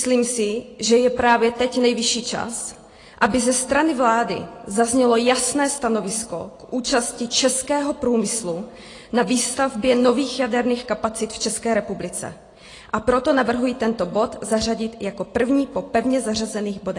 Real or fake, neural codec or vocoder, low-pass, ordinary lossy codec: real; none; 10.8 kHz; AAC, 32 kbps